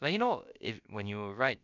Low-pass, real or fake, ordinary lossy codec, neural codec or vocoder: 7.2 kHz; fake; none; codec, 16 kHz, about 1 kbps, DyCAST, with the encoder's durations